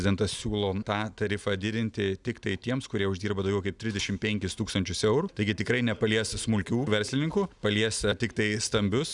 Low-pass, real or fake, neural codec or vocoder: 10.8 kHz; real; none